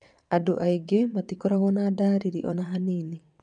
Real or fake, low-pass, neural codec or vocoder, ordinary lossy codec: fake; 9.9 kHz; vocoder, 22.05 kHz, 80 mel bands, WaveNeXt; none